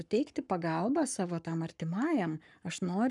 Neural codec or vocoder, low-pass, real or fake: codec, 44.1 kHz, 7.8 kbps, Pupu-Codec; 10.8 kHz; fake